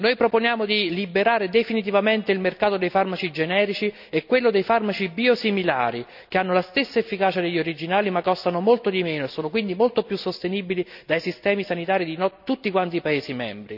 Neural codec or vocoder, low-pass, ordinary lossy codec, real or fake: none; 5.4 kHz; none; real